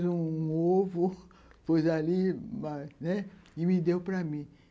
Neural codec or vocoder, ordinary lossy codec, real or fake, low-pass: none; none; real; none